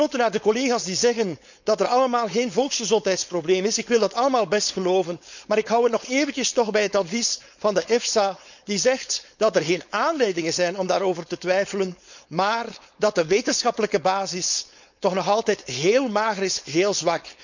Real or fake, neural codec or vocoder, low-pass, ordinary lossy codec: fake; codec, 16 kHz, 4.8 kbps, FACodec; 7.2 kHz; none